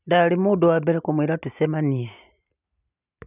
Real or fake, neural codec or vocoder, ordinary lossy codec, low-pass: real; none; none; 3.6 kHz